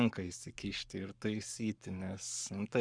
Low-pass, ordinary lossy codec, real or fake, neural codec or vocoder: 9.9 kHz; Opus, 64 kbps; fake; codec, 44.1 kHz, 7.8 kbps, Pupu-Codec